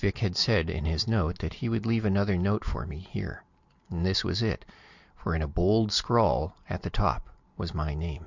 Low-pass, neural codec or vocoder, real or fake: 7.2 kHz; none; real